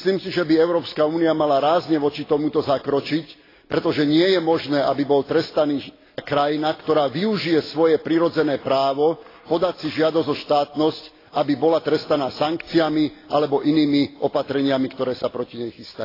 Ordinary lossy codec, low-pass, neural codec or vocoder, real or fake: AAC, 24 kbps; 5.4 kHz; none; real